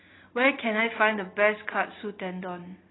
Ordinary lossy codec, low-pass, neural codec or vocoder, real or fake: AAC, 16 kbps; 7.2 kHz; none; real